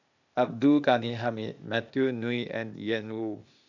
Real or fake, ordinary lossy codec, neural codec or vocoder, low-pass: fake; none; codec, 16 kHz, 0.8 kbps, ZipCodec; 7.2 kHz